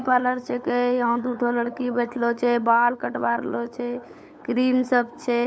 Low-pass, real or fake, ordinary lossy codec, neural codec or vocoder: none; fake; none; codec, 16 kHz, 8 kbps, FunCodec, trained on LibriTTS, 25 frames a second